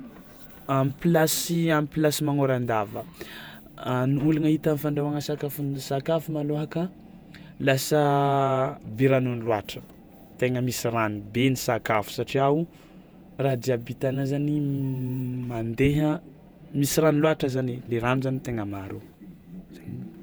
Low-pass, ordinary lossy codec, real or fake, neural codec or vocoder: none; none; fake; vocoder, 48 kHz, 128 mel bands, Vocos